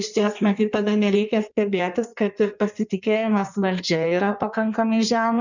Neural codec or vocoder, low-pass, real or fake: codec, 16 kHz in and 24 kHz out, 1.1 kbps, FireRedTTS-2 codec; 7.2 kHz; fake